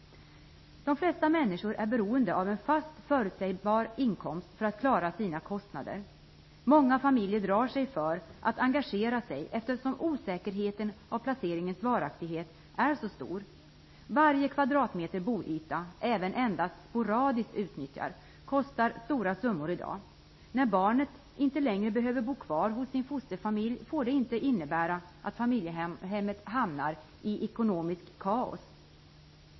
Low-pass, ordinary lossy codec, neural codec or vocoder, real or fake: 7.2 kHz; MP3, 24 kbps; none; real